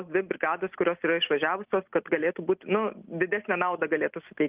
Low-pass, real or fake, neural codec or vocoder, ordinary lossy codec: 3.6 kHz; real; none; Opus, 32 kbps